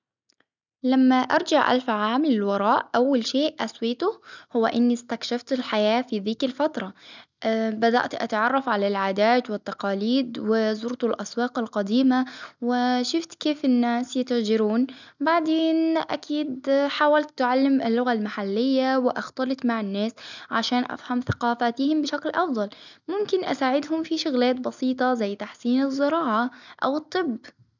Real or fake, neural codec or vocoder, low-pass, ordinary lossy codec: real; none; 7.2 kHz; none